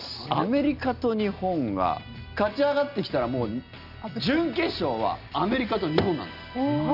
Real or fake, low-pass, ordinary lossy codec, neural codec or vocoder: real; 5.4 kHz; none; none